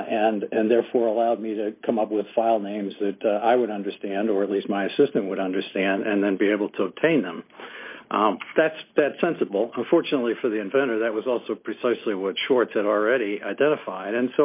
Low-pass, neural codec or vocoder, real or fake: 3.6 kHz; none; real